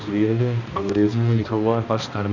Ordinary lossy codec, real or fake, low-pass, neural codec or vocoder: none; fake; 7.2 kHz; codec, 16 kHz, 1 kbps, X-Codec, HuBERT features, trained on balanced general audio